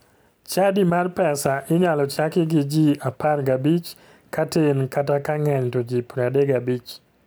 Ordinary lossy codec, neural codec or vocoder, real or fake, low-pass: none; none; real; none